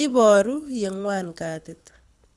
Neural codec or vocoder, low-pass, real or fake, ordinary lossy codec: codec, 24 kHz, 6 kbps, HILCodec; none; fake; none